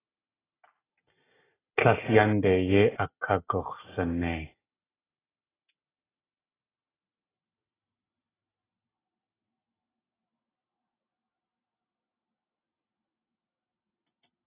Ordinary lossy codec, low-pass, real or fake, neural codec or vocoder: AAC, 16 kbps; 3.6 kHz; real; none